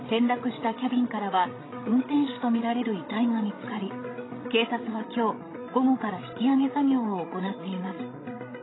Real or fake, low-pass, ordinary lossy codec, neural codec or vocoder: fake; 7.2 kHz; AAC, 16 kbps; codec, 16 kHz, 16 kbps, FreqCodec, larger model